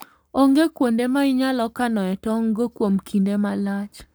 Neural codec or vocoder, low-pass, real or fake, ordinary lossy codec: codec, 44.1 kHz, 7.8 kbps, Pupu-Codec; none; fake; none